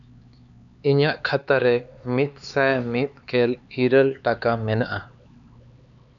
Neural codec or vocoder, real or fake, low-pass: codec, 16 kHz, 4 kbps, X-Codec, HuBERT features, trained on LibriSpeech; fake; 7.2 kHz